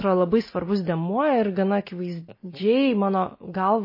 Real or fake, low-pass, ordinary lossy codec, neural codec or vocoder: real; 5.4 kHz; MP3, 24 kbps; none